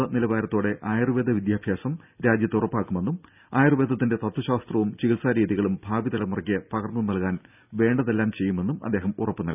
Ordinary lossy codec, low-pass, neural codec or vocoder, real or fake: none; 3.6 kHz; none; real